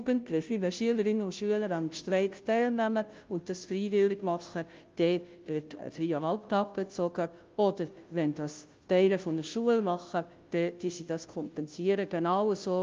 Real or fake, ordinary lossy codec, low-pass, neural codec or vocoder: fake; Opus, 32 kbps; 7.2 kHz; codec, 16 kHz, 0.5 kbps, FunCodec, trained on Chinese and English, 25 frames a second